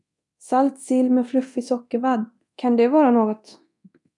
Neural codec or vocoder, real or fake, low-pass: codec, 24 kHz, 0.9 kbps, DualCodec; fake; 10.8 kHz